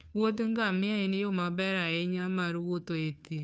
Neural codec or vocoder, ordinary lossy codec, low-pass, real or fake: codec, 16 kHz, 2 kbps, FunCodec, trained on Chinese and English, 25 frames a second; none; none; fake